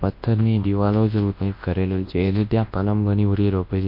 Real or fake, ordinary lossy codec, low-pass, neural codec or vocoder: fake; MP3, 32 kbps; 5.4 kHz; codec, 24 kHz, 0.9 kbps, WavTokenizer, large speech release